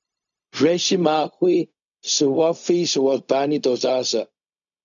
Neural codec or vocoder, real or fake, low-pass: codec, 16 kHz, 0.4 kbps, LongCat-Audio-Codec; fake; 7.2 kHz